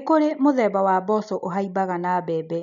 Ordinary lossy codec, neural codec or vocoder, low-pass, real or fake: none; none; 7.2 kHz; real